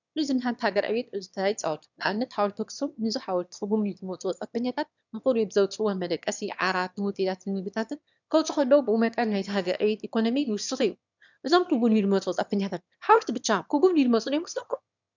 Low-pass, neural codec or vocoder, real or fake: 7.2 kHz; autoencoder, 22.05 kHz, a latent of 192 numbers a frame, VITS, trained on one speaker; fake